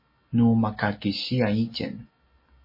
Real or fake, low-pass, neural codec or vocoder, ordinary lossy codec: real; 5.4 kHz; none; MP3, 24 kbps